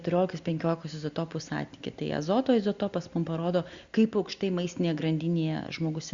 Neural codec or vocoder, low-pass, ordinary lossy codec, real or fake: none; 7.2 kHz; Opus, 64 kbps; real